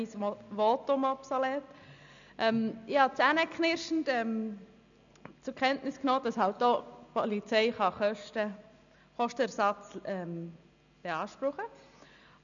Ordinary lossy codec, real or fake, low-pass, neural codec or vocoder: none; real; 7.2 kHz; none